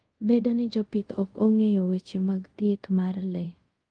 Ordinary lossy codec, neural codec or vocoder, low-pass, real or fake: Opus, 24 kbps; codec, 24 kHz, 0.5 kbps, DualCodec; 9.9 kHz; fake